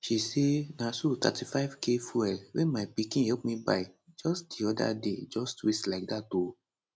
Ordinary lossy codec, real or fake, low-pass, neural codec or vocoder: none; real; none; none